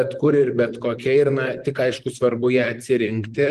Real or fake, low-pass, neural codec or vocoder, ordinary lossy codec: fake; 14.4 kHz; vocoder, 44.1 kHz, 128 mel bands, Pupu-Vocoder; Opus, 24 kbps